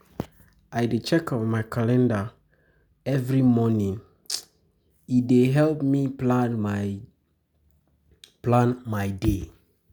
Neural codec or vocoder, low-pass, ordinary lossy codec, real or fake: none; none; none; real